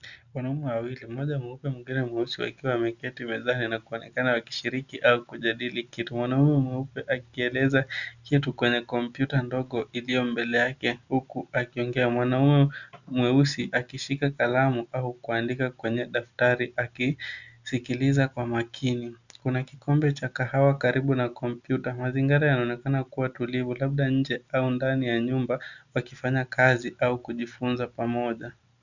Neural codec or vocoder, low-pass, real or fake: none; 7.2 kHz; real